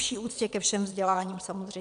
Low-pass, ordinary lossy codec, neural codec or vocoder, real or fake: 9.9 kHz; MP3, 96 kbps; vocoder, 22.05 kHz, 80 mel bands, Vocos; fake